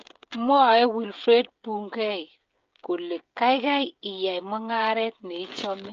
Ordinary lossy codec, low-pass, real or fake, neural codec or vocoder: Opus, 24 kbps; 7.2 kHz; fake; codec, 16 kHz, 16 kbps, FreqCodec, smaller model